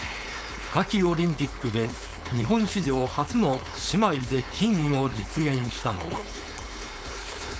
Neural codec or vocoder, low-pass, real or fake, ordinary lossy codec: codec, 16 kHz, 4.8 kbps, FACodec; none; fake; none